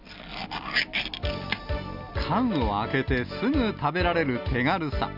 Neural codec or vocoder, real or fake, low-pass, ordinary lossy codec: none; real; 5.4 kHz; none